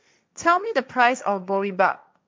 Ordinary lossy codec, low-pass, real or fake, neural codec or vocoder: none; none; fake; codec, 16 kHz, 1.1 kbps, Voila-Tokenizer